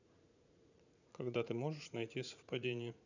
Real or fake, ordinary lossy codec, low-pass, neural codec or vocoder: fake; none; 7.2 kHz; vocoder, 44.1 kHz, 80 mel bands, Vocos